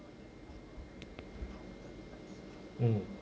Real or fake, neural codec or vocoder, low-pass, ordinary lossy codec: real; none; none; none